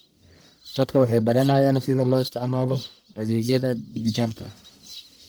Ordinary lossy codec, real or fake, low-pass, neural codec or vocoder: none; fake; none; codec, 44.1 kHz, 1.7 kbps, Pupu-Codec